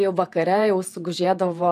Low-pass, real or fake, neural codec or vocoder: 14.4 kHz; fake; vocoder, 48 kHz, 128 mel bands, Vocos